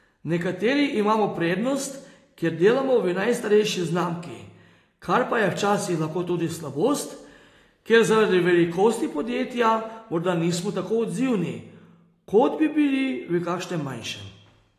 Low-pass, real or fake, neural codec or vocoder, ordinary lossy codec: 14.4 kHz; real; none; AAC, 48 kbps